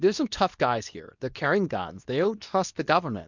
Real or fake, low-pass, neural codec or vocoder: fake; 7.2 kHz; codec, 24 kHz, 0.9 kbps, WavTokenizer, medium speech release version 2